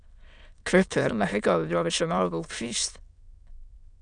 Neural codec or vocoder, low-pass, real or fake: autoencoder, 22.05 kHz, a latent of 192 numbers a frame, VITS, trained on many speakers; 9.9 kHz; fake